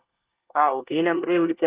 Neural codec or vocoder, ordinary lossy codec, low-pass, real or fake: codec, 24 kHz, 1 kbps, SNAC; Opus, 32 kbps; 3.6 kHz; fake